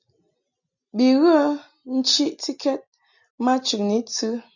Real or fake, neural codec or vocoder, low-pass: real; none; 7.2 kHz